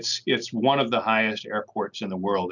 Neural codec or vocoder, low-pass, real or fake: none; 7.2 kHz; real